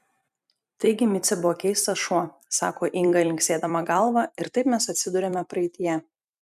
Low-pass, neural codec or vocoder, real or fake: 14.4 kHz; vocoder, 44.1 kHz, 128 mel bands every 256 samples, BigVGAN v2; fake